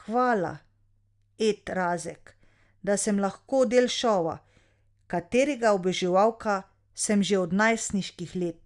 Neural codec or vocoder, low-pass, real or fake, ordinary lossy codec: none; 10.8 kHz; real; Opus, 64 kbps